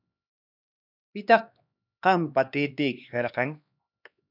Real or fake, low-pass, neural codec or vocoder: fake; 5.4 kHz; codec, 16 kHz, 2 kbps, X-Codec, HuBERT features, trained on LibriSpeech